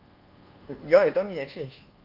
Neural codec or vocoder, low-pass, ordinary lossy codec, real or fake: codec, 24 kHz, 1.2 kbps, DualCodec; 5.4 kHz; Opus, 32 kbps; fake